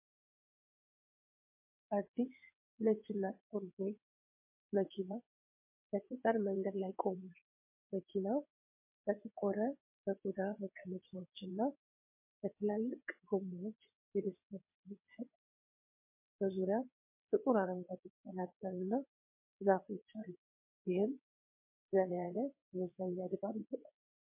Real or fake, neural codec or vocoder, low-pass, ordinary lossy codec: fake; vocoder, 22.05 kHz, 80 mel bands, WaveNeXt; 3.6 kHz; MP3, 24 kbps